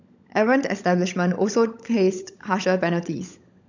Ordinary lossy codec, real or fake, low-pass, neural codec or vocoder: none; fake; 7.2 kHz; codec, 16 kHz, 16 kbps, FunCodec, trained on LibriTTS, 50 frames a second